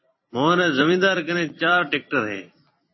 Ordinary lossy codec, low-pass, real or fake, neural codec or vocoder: MP3, 24 kbps; 7.2 kHz; fake; vocoder, 44.1 kHz, 128 mel bands every 256 samples, BigVGAN v2